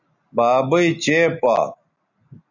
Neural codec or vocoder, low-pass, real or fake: none; 7.2 kHz; real